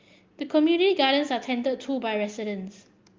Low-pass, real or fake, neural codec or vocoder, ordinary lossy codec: 7.2 kHz; real; none; Opus, 24 kbps